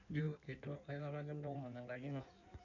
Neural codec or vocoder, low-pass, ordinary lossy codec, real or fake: codec, 16 kHz in and 24 kHz out, 1.1 kbps, FireRedTTS-2 codec; 7.2 kHz; none; fake